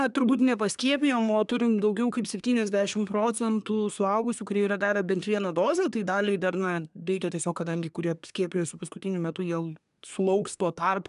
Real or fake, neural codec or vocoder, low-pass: fake; codec, 24 kHz, 1 kbps, SNAC; 10.8 kHz